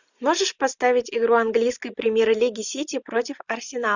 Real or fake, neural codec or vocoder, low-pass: real; none; 7.2 kHz